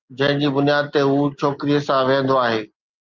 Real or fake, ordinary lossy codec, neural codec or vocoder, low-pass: real; Opus, 32 kbps; none; 7.2 kHz